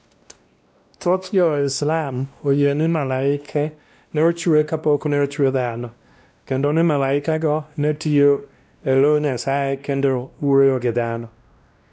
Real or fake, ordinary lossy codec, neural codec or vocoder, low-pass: fake; none; codec, 16 kHz, 1 kbps, X-Codec, WavLM features, trained on Multilingual LibriSpeech; none